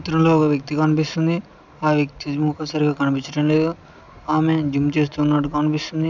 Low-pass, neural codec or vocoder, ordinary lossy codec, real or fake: 7.2 kHz; none; none; real